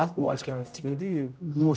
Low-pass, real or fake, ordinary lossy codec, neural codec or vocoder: none; fake; none; codec, 16 kHz, 0.5 kbps, X-Codec, HuBERT features, trained on general audio